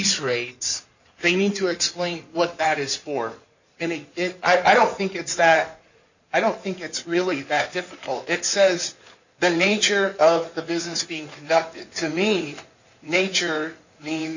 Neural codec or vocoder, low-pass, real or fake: codec, 16 kHz in and 24 kHz out, 2.2 kbps, FireRedTTS-2 codec; 7.2 kHz; fake